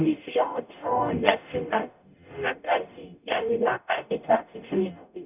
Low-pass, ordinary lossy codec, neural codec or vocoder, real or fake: 3.6 kHz; none; codec, 44.1 kHz, 0.9 kbps, DAC; fake